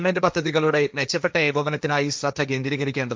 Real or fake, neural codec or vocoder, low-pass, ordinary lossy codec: fake; codec, 16 kHz, 1.1 kbps, Voila-Tokenizer; none; none